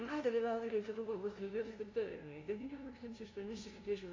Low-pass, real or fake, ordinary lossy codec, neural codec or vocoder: 7.2 kHz; fake; AAC, 32 kbps; codec, 16 kHz, 0.5 kbps, FunCodec, trained on LibriTTS, 25 frames a second